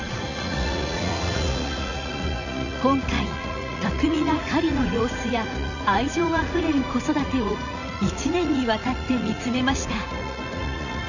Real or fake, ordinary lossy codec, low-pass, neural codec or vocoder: fake; none; 7.2 kHz; vocoder, 44.1 kHz, 80 mel bands, Vocos